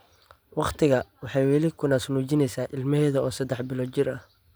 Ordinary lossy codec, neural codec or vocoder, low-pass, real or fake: none; none; none; real